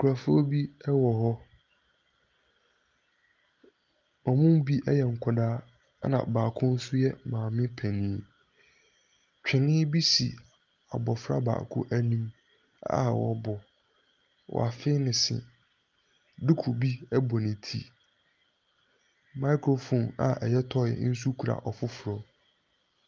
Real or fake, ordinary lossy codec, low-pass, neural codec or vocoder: real; Opus, 24 kbps; 7.2 kHz; none